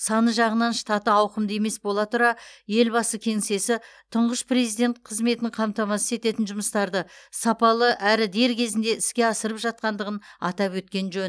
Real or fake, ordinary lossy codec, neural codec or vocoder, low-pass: real; none; none; none